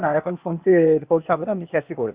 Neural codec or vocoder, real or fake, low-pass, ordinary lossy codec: codec, 16 kHz, 0.8 kbps, ZipCodec; fake; 3.6 kHz; Opus, 64 kbps